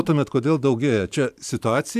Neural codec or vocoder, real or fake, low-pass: vocoder, 44.1 kHz, 128 mel bands every 512 samples, BigVGAN v2; fake; 14.4 kHz